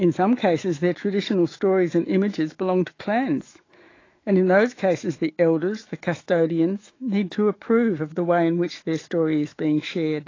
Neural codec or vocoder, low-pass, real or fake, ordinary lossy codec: autoencoder, 48 kHz, 128 numbers a frame, DAC-VAE, trained on Japanese speech; 7.2 kHz; fake; AAC, 32 kbps